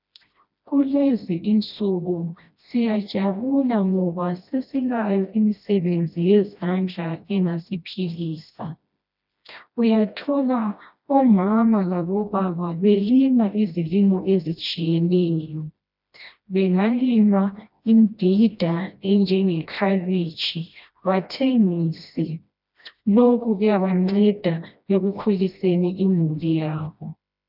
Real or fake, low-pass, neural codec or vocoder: fake; 5.4 kHz; codec, 16 kHz, 1 kbps, FreqCodec, smaller model